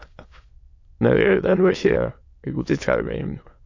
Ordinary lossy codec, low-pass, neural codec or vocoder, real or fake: AAC, 48 kbps; 7.2 kHz; autoencoder, 22.05 kHz, a latent of 192 numbers a frame, VITS, trained on many speakers; fake